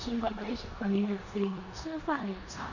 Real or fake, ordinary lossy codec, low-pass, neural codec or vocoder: fake; none; 7.2 kHz; codec, 16 kHz in and 24 kHz out, 0.9 kbps, LongCat-Audio-Codec, four codebook decoder